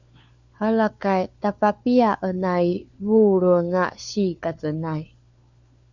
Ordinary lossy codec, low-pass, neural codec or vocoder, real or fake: Opus, 64 kbps; 7.2 kHz; codec, 16 kHz, 4 kbps, FunCodec, trained on LibriTTS, 50 frames a second; fake